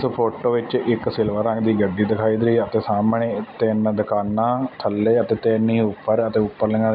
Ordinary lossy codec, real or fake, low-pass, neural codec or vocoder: none; real; 5.4 kHz; none